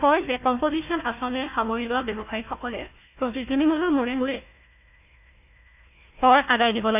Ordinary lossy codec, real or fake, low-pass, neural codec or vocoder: none; fake; 3.6 kHz; codec, 16 kHz, 1 kbps, FunCodec, trained on Chinese and English, 50 frames a second